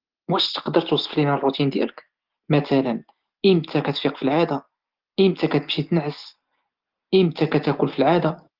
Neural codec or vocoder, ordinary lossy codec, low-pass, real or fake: none; Opus, 16 kbps; 5.4 kHz; real